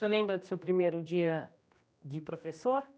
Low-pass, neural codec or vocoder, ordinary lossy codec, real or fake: none; codec, 16 kHz, 1 kbps, X-Codec, HuBERT features, trained on general audio; none; fake